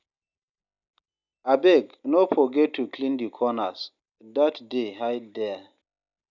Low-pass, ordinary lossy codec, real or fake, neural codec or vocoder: 7.2 kHz; none; real; none